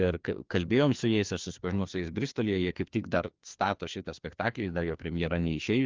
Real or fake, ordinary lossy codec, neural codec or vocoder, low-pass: fake; Opus, 32 kbps; codec, 16 kHz, 2 kbps, FreqCodec, larger model; 7.2 kHz